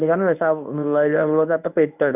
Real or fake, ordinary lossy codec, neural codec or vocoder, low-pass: fake; none; codec, 24 kHz, 0.9 kbps, WavTokenizer, medium speech release version 1; 3.6 kHz